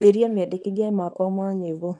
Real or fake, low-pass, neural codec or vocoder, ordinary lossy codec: fake; 10.8 kHz; codec, 24 kHz, 0.9 kbps, WavTokenizer, small release; none